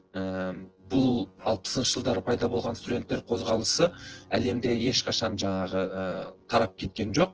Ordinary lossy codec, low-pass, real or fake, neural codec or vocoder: Opus, 16 kbps; 7.2 kHz; fake; vocoder, 24 kHz, 100 mel bands, Vocos